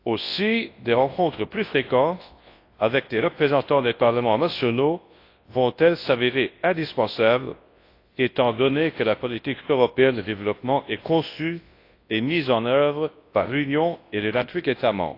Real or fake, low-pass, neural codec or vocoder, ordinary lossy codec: fake; 5.4 kHz; codec, 24 kHz, 0.9 kbps, WavTokenizer, large speech release; AAC, 32 kbps